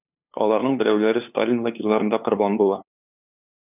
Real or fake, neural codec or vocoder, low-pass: fake; codec, 16 kHz, 2 kbps, FunCodec, trained on LibriTTS, 25 frames a second; 3.6 kHz